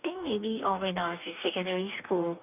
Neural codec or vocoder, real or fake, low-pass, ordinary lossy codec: codec, 44.1 kHz, 2.6 kbps, DAC; fake; 3.6 kHz; none